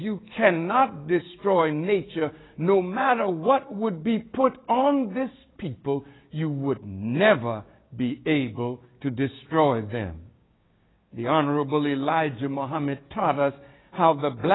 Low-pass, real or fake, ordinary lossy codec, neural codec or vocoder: 7.2 kHz; fake; AAC, 16 kbps; codec, 16 kHz, 6 kbps, DAC